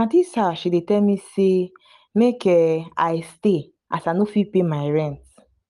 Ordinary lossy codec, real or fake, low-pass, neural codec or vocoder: Opus, 32 kbps; real; 10.8 kHz; none